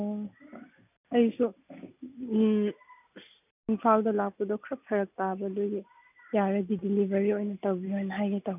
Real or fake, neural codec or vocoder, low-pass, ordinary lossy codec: real; none; 3.6 kHz; none